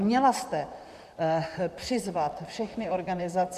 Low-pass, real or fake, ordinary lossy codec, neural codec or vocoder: 14.4 kHz; fake; MP3, 96 kbps; vocoder, 44.1 kHz, 128 mel bands, Pupu-Vocoder